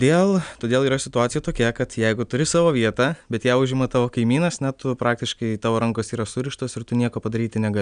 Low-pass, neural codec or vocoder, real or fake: 9.9 kHz; none; real